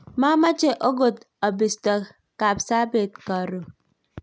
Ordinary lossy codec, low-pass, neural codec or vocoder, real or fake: none; none; none; real